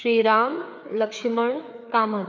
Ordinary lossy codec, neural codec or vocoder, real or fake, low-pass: none; codec, 16 kHz, 4 kbps, FreqCodec, larger model; fake; none